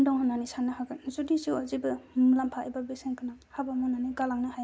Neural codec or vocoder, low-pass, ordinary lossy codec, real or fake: none; none; none; real